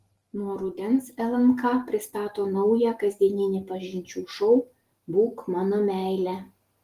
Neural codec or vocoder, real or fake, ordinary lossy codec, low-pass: none; real; Opus, 24 kbps; 14.4 kHz